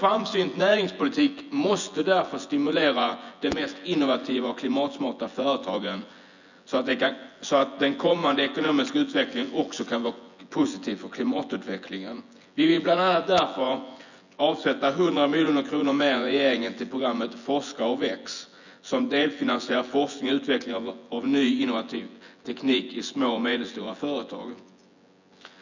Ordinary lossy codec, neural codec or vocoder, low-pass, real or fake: none; vocoder, 24 kHz, 100 mel bands, Vocos; 7.2 kHz; fake